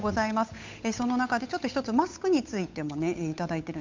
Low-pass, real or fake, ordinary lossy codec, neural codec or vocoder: 7.2 kHz; fake; MP3, 64 kbps; codec, 16 kHz, 8 kbps, FunCodec, trained on Chinese and English, 25 frames a second